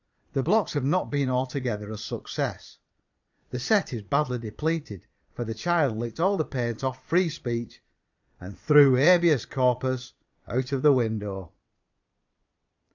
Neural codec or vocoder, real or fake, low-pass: vocoder, 22.05 kHz, 80 mel bands, Vocos; fake; 7.2 kHz